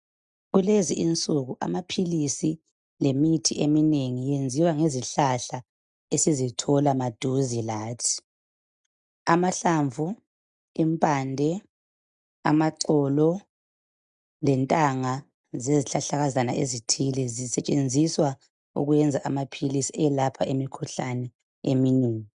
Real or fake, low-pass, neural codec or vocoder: real; 9.9 kHz; none